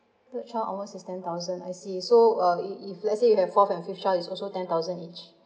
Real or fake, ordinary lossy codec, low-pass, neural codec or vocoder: real; none; none; none